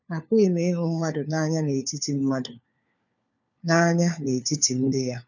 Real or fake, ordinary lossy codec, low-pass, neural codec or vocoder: fake; none; 7.2 kHz; codec, 16 kHz, 4 kbps, FunCodec, trained on LibriTTS, 50 frames a second